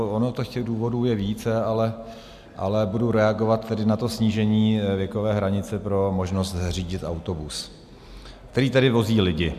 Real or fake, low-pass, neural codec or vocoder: real; 14.4 kHz; none